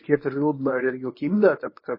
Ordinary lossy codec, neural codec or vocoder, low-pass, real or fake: MP3, 24 kbps; codec, 24 kHz, 0.9 kbps, WavTokenizer, medium speech release version 1; 5.4 kHz; fake